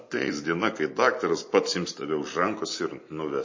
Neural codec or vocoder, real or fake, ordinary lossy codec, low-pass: none; real; MP3, 32 kbps; 7.2 kHz